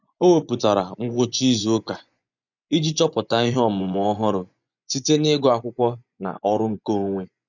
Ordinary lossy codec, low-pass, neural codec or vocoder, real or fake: none; 7.2 kHz; vocoder, 24 kHz, 100 mel bands, Vocos; fake